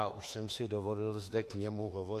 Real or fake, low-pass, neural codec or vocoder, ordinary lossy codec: fake; 14.4 kHz; autoencoder, 48 kHz, 32 numbers a frame, DAC-VAE, trained on Japanese speech; AAC, 64 kbps